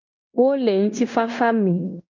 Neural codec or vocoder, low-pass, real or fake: codec, 16 kHz in and 24 kHz out, 0.9 kbps, LongCat-Audio-Codec, fine tuned four codebook decoder; 7.2 kHz; fake